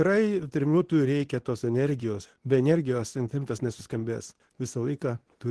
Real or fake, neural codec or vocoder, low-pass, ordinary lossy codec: fake; codec, 24 kHz, 0.9 kbps, WavTokenizer, medium speech release version 2; 10.8 kHz; Opus, 16 kbps